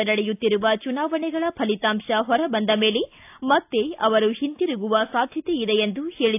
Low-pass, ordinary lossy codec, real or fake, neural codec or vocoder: 3.6 kHz; AAC, 32 kbps; real; none